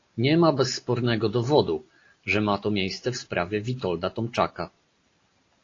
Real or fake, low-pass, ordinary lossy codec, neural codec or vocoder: real; 7.2 kHz; AAC, 32 kbps; none